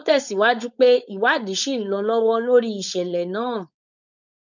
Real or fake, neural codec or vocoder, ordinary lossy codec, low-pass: fake; codec, 16 kHz, 4.8 kbps, FACodec; none; 7.2 kHz